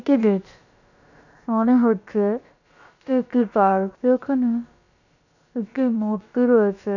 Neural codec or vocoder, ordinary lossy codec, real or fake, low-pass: codec, 16 kHz, about 1 kbps, DyCAST, with the encoder's durations; none; fake; 7.2 kHz